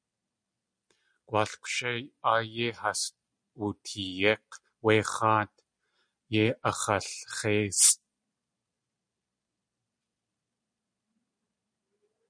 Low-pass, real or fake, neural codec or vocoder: 9.9 kHz; real; none